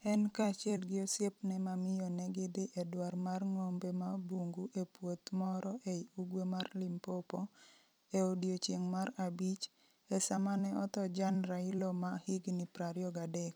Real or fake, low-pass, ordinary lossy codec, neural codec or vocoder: fake; none; none; vocoder, 44.1 kHz, 128 mel bands every 256 samples, BigVGAN v2